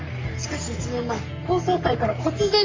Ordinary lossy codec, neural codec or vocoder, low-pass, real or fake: MP3, 48 kbps; codec, 44.1 kHz, 3.4 kbps, Pupu-Codec; 7.2 kHz; fake